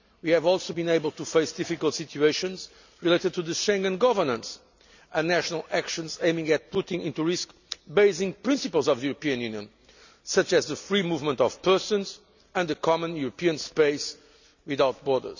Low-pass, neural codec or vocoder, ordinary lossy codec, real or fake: 7.2 kHz; none; none; real